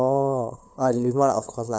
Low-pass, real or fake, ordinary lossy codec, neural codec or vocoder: none; fake; none; codec, 16 kHz, 8 kbps, FunCodec, trained on LibriTTS, 25 frames a second